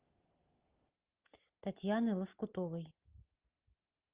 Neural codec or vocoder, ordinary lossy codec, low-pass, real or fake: none; Opus, 32 kbps; 3.6 kHz; real